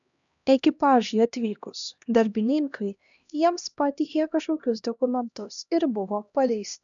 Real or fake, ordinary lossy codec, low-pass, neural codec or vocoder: fake; MP3, 96 kbps; 7.2 kHz; codec, 16 kHz, 1 kbps, X-Codec, HuBERT features, trained on LibriSpeech